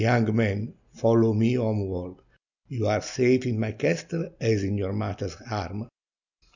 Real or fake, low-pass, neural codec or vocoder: real; 7.2 kHz; none